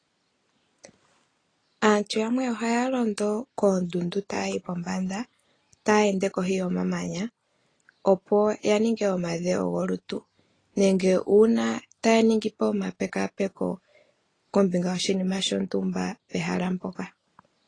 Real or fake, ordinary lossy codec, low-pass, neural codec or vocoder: real; AAC, 32 kbps; 9.9 kHz; none